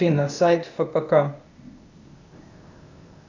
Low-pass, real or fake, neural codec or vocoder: 7.2 kHz; fake; codec, 16 kHz, 0.8 kbps, ZipCodec